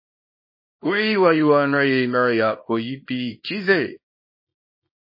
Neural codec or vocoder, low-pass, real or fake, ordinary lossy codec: codec, 24 kHz, 0.9 kbps, WavTokenizer, small release; 5.4 kHz; fake; MP3, 24 kbps